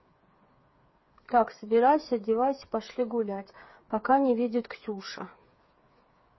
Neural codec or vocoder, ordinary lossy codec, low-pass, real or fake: codec, 16 kHz, 8 kbps, FreqCodec, smaller model; MP3, 24 kbps; 7.2 kHz; fake